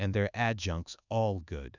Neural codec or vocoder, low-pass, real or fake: codec, 24 kHz, 1.2 kbps, DualCodec; 7.2 kHz; fake